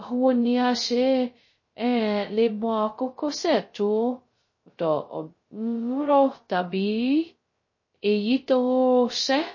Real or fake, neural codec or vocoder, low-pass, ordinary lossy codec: fake; codec, 16 kHz, 0.2 kbps, FocalCodec; 7.2 kHz; MP3, 32 kbps